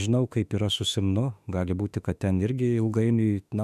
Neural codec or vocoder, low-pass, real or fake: autoencoder, 48 kHz, 32 numbers a frame, DAC-VAE, trained on Japanese speech; 14.4 kHz; fake